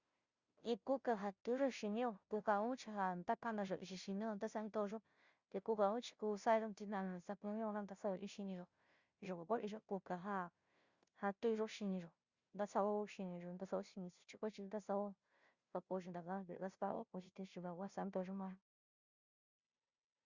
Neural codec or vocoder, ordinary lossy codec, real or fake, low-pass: codec, 16 kHz, 0.5 kbps, FunCodec, trained on Chinese and English, 25 frames a second; Opus, 64 kbps; fake; 7.2 kHz